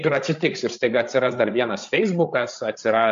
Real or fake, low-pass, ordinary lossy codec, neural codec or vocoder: fake; 7.2 kHz; AAC, 64 kbps; codec, 16 kHz, 8 kbps, FreqCodec, larger model